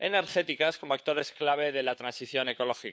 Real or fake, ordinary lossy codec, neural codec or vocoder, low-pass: fake; none; codec, 16 kHz, 4 kbps, FunCodec, trained on LibriTTS, 50 frames a second; none